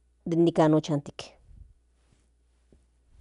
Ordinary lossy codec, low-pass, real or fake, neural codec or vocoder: none; 10.8 kHz; real; none